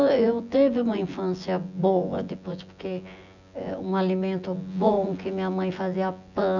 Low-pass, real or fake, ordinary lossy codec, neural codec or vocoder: 7.2 kHz; fake; none; vocoder, 24 kHz, 100 mel bands, Vocos